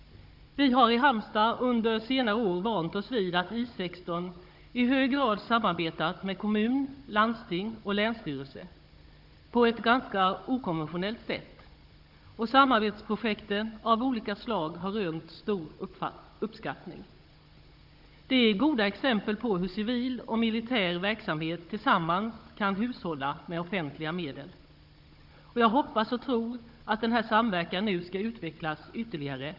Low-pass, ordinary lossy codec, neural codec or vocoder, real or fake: 5.4 kHz; Opus, 64 kbps; codec, 16 kHz, 16 kbps, FunCodec, trained on Chinese and English, 50 frames a second; fake